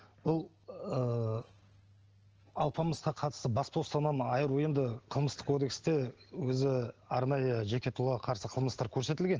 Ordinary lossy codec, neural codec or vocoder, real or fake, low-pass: Opus, 16 kbps; none; real; 7.2 kHz